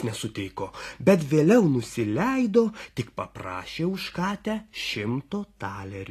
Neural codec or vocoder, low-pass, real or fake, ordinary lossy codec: none; 14.4 kHz; real; AAC, 48 kbps